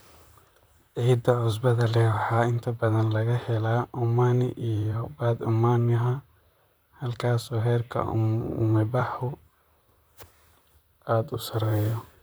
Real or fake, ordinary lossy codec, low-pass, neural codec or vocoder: fake; none; none; vocoder, 44.1 kHz, 128 mel bands, Pupu-Vocoder